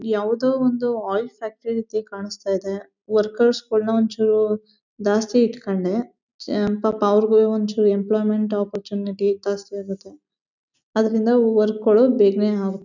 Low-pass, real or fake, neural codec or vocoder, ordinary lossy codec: 7.2 kHz; real; none; none